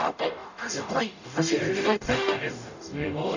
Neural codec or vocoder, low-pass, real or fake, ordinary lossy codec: codec, 44.1 kHz, 0.9 kbps, DAC; 7.2 kHz; fake; none